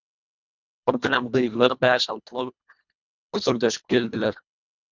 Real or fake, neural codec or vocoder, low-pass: fake; codec, 24 kHz, 1.5 kbps, HILCodec; 7.2 kHz